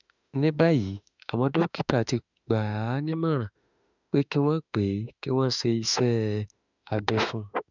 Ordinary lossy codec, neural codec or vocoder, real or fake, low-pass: none; autoencoder, 48 kHz, 32 numbers a frame, DAC-VAE, trained on Japanese speech; fake; 7.2 kHz